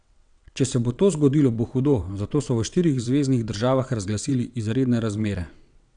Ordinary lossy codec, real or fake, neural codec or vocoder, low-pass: none; fake; vocoder, 22.05 kHz, 80 mel bands, WaveNeXt; 9.9 kHz